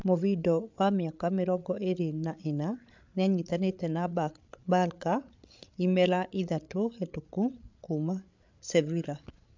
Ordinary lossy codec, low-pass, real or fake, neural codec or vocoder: MP3, 64 kbps; 7.2 kHz; fake; codec, 16 kHz, 8 kbps, FreqCodec, larger model